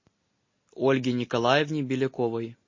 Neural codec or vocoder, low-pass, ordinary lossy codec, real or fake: none; 7.2 kHz; MP3, 32 kbps; real